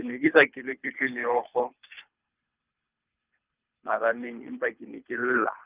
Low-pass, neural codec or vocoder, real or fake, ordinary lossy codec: 3.6 kHz; codec, 24 kHz, 3 kbps, HILCodec; fake; Opus, 24 kbps